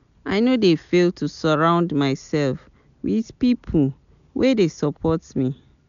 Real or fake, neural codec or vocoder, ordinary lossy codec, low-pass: real; none; none; 7.2 kHz